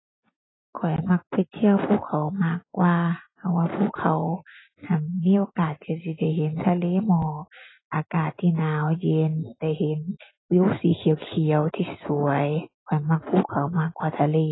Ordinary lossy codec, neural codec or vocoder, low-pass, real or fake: AAC, 16 kbps; none; 7.2 kHz; real